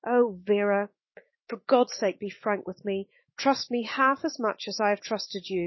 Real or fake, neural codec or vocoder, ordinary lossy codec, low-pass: fake; autoencoder, 48 kHz, 128 numbers a frame, DAC-VAE, trained on Japanese speech; MP3, 24 kbps; 7.2 kHz